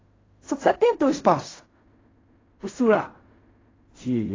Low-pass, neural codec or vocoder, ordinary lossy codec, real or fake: 7.2 kHz; codec, 16 kHz in and 24 kHz out, 0.4 kbps, LongCat-Audio-Codec, fine tuned four codebook decoder; AAC, 32 kbps; fake